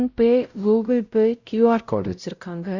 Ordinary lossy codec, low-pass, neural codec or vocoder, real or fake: Opus, 64 kbps; 7.2 kHz; codec, 16 kHz, 0.5 kbps, X-Codec, WavLM features, trained on Multilingual LibriSpeech; fake